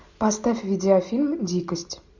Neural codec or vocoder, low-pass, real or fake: none; 7.2 kHz; real